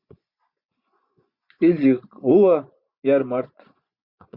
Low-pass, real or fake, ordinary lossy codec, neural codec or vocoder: 5.4 kHz; fake; Opus, 64 kbps; vocoder, 24 kHz, 100 mel bands, Vocos